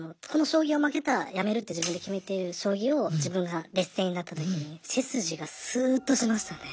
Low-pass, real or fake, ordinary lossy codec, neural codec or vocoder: none; real; none; none